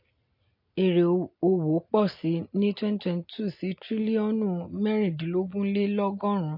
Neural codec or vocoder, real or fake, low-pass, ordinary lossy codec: none; real; 5.4 kHz; MP3, 32 kbps